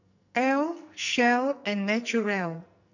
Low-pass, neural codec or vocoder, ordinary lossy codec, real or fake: 7.2 kHz; codec, 44.1 kHz, 2.6 kbps, SNAC; none; fake